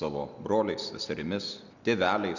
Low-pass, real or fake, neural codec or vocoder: 7.2 kHz; real; none